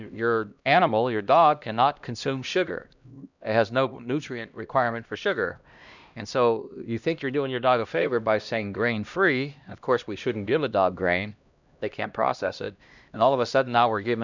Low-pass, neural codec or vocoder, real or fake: 7.2 kHz; codec, 16 kHz, 1 kbps, X-Codec, HuBERT features, trained on LibriSpeech; fake